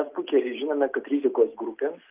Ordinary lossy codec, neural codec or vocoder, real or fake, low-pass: Opus, 24 kbps; none; real; 3.6 kHz